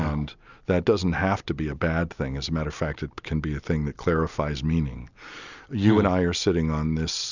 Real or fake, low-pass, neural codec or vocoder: real; 7.2 kHz; none